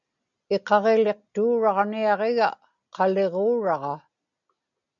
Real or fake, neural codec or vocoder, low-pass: real; none; 7.2 kHz